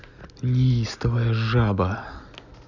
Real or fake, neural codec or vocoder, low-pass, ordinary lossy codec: real; none; 7.2 kHz; none